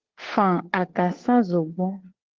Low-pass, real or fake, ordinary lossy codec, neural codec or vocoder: 7.2 kHz; fake; Opus, 16 kbps; codec, 16 kHz, 2 kbps, FunCodec, trained on Chinese and English, 25 frames a second